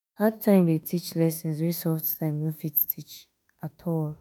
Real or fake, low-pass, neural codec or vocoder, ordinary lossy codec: fake; none; autoencoder, 48 kHz, 32 numbers a frame, DAC-VAE, trained on Japanese speech; none